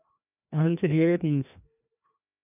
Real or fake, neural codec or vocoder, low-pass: fake; codec, 16 kHz, 1 kbps, FreqCodec, larger model; 3.6 kHz